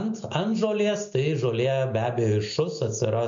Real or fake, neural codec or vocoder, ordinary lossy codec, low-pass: real; none; MP3, 48 kbps; 7.2 kHz